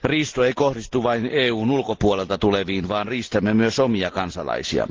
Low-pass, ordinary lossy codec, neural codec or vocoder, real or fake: 7.2 kHz; Opus, 16 kbps; none; real